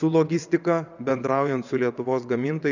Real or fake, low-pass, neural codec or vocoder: fake; 7.2 kHz; vocoder, 22.05 kHz, 80 mel bands, WaveNeXt